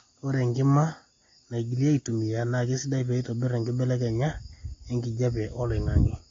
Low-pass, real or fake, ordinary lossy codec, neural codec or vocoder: 7.2 kHz; real; AAC, 32 kbps; none